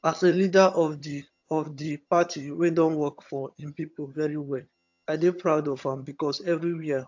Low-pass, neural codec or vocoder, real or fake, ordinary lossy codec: 7.2 kHz; vocoder, 22.05 kHz, 80 mel bands, HiFi-GAN; fake; AAC, 48 kbps